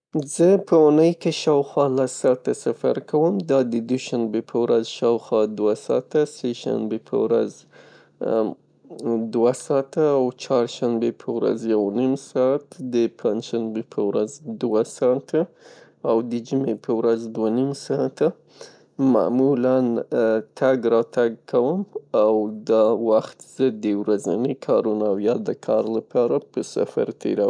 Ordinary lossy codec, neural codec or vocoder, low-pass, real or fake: none; none; 9.9 kHz; real